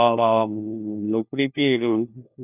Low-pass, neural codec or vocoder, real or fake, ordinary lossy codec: 3.6 kHz; codec, 16 kHz, 1 kbps, FunCodec, trained on LibriTTS, 50 frames a second; fake; none